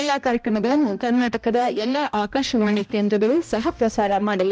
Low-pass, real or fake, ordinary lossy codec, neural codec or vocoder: none; fake; none; codec, 16 kHz, 1 kbps, X-Codec, HuBERT features, trained on balanced general audio